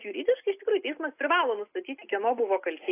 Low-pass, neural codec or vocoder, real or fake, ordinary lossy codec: 3.6 kHz; none; real; AAC, 24 kbps